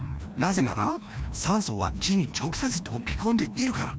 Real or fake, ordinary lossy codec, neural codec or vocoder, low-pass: fake; none; codec, 16 kHz, 1 kbps, FreqCodec, larger model; none